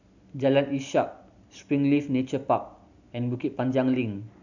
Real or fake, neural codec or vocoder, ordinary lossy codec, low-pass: real; none; none; 7.2 kHz